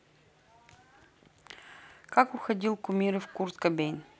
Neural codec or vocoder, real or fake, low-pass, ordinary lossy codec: none; real; none; none